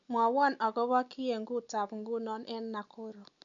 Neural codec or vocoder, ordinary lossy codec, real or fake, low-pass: none; none; real; 7.2 kHz